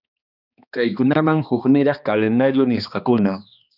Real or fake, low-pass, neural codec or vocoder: fake; 5.4 kHz; codec, 16 kHz, 2 kbps, X-Codec, HuBERT features, trained on balanced general audio